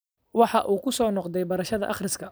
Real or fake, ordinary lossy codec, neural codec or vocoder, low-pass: real; none; none; none